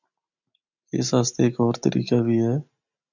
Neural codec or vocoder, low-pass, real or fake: none; 7.2 kHz; real